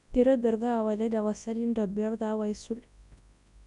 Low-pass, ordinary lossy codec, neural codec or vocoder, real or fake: 10.8 kHz; none; codec, 24 kHz, 0.9 kbps, WavTokenizer, large speech release; fake